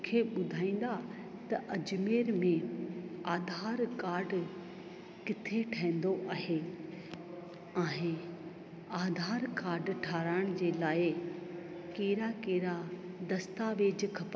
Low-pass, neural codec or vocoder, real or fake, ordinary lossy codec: none; none; real; none